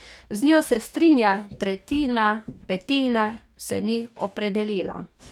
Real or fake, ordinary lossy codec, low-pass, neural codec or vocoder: fake; none; 19.8 kHz; codec, 44.1 kHz, 2.6 kbps, DAC